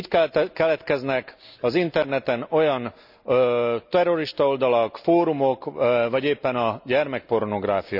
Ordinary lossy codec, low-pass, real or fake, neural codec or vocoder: none; 5.4 kHz; real; none